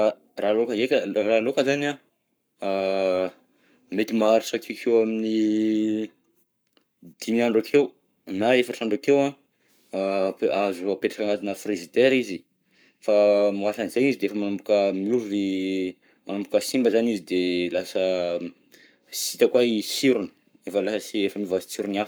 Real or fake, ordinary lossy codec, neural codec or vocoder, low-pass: fake; none; codec, 44.1 kHz, 7.8 kbps, Pupu-Codec; none